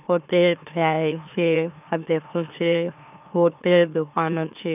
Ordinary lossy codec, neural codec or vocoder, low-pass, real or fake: none; autoencoder, 44.1 kHz, a latent of 192 numbers a frame, MeloTTS; 3.6 kHz; fake